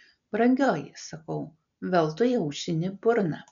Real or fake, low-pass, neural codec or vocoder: real; 7.2 kHz; none